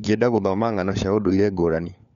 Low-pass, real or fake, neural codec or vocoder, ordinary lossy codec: 7.2 kHz; fake; codec, 16 kHz, 4 kbps, FunCodec, trained on LibriTTS, 50 frames a second; none